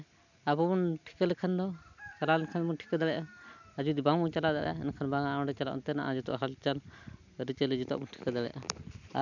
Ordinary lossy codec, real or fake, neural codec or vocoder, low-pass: none; real; none; 7.2 kHz